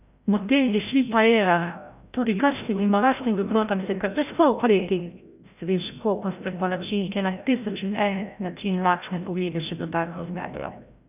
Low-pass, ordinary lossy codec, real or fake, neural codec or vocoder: 3.6 kHz; none; fake; codec, 16 kHz, 0.5 kbps, FreqCodec, larger model